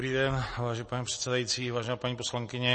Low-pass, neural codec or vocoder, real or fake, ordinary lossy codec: 10.8 kHz; none; real; MP3, 32 kbps